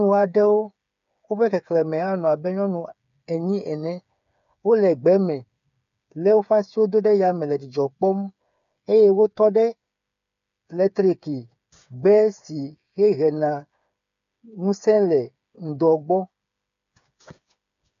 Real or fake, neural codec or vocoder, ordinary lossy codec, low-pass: fake; codec, 16 kHz, 8 kbps, FreqCodec, smaller model; MP3, 64 kbps; 7.2 kHz